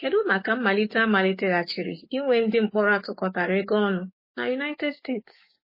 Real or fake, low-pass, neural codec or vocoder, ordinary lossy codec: fake; 5.4 kHz; codec, 44.1 kHz, 7.8 kbps, DAC; MP3, 24 kbps